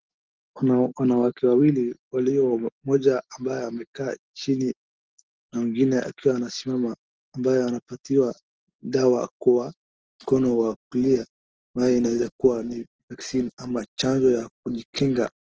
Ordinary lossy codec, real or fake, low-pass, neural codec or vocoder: Opus, 16 kbps; real; 7.2 kHz; none